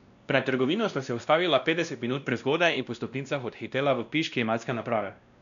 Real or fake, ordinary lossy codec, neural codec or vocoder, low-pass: fake; none; codec, 16 kHz, 1 kbps, X-Codec, WavLM features, trained on Multilingual LibriSpeech; 7.2 kHz